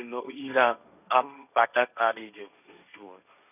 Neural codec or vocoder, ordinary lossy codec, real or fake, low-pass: codec, 16 kHz, 1.1 kbps, Voila-Tokenizer; none; fake; 3.6 kHz